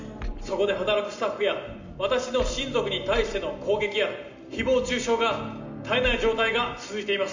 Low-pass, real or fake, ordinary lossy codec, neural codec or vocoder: 7.2 kHz; real; MP3, 48 kbps; none